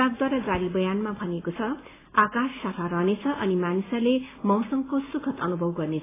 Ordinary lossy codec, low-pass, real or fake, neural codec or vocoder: AAC, 16 kbps; 3.6 kHz; real; none